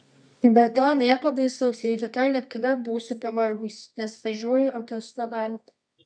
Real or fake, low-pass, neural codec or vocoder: fake; 9.9 kHz; codec, 24 kHz, 0.9 kbps, WavTokenizer, medium music audio release